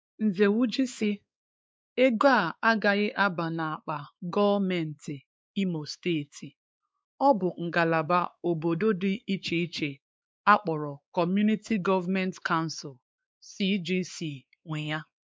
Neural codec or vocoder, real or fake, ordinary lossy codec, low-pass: codec, 16 kHz, 4 kbps, X-Codec, WavLM features, trained on Multilingual LibriSpeech; fake; none; none